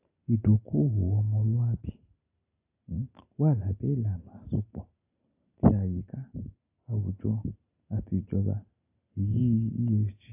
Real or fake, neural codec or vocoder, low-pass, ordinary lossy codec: real; none; 3.6 kHz; AAC, 32 kbps